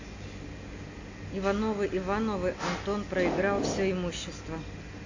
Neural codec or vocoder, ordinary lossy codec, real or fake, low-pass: none; AAC, 48 kbps; real; 7.2 kHz